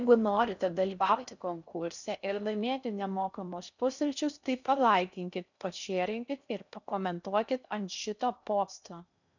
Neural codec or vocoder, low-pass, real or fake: codec, 16 kHz in and 24 kHz out, 0.6 kbps, FocalCodec, streaming, 4096 codes; 7.2 kHz; fake